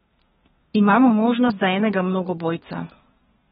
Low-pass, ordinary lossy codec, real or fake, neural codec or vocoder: 14.4 kHz; AAC, 16 kbps; fake; codec, 32 kHz, 1.9 kbps, SNAC